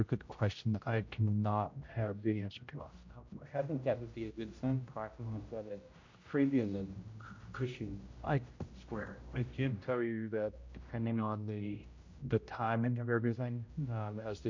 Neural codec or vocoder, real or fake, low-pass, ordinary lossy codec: codec, 16 kHz, 0.5 kbps, X-Codec, HuBERT features, trained on general audio; fake; 7.2 kHz; MP3, 48 kbps